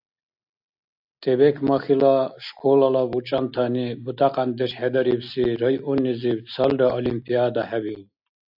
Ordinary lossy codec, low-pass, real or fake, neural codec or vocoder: AAC, 48 kbps; 5.4 kHz; real; none